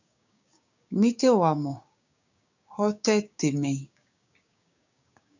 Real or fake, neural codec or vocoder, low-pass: fake; codec, 16 kHz, 6 kbps, DAC; 7.2 kHz